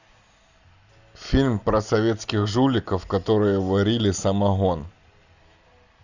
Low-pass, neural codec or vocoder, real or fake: 7.2 kHz; none; real